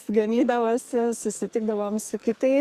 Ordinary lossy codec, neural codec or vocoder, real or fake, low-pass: Opus, 64 kbps; codec, 44.1 kHz, 2.6 kbps, SNAC; fake; 14.4 kHz